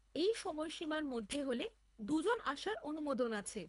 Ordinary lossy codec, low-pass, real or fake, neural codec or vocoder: AAC, 96 kbps; 10.8 kHz; fake; codec, 24 kHz, 3 kbps, HILCodec